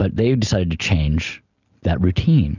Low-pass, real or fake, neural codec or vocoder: 7.2 kHz; real; none